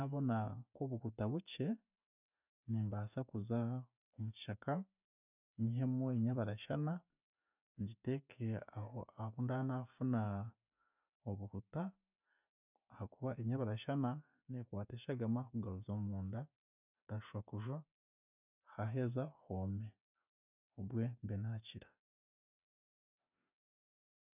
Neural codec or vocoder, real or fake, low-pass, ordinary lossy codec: none; real; 3.6 kHz; none